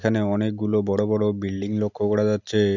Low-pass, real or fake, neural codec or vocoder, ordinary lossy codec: 7.2 kHz; real; none; none